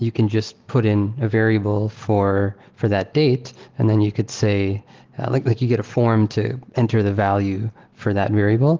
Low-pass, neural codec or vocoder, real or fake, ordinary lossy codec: 7.2 kHz; none; real; Opus, 16 kbps